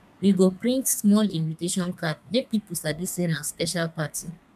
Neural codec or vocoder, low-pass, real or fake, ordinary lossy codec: codec, 32 kHz, 1.9 kbps, SNAC; 14.4 kHz; fake; none